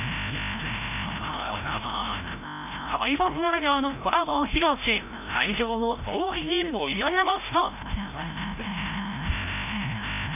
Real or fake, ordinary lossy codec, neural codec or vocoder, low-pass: fake; none; codec, 16 kHz, 0.5 kbps, FreqCodec, larger model; 3.6 kHz